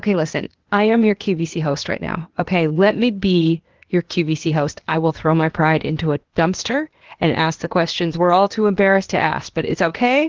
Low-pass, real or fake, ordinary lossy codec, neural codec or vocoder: 7.2 kHz; fake; Opus, 16 kbps; codec, 16 kHz, 0.8 kbps, ZipCodec